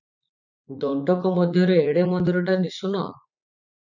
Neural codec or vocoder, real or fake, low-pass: vocoder, 44.1 kHz, 80 mel bands, Vocos; fake; 7.2 kHz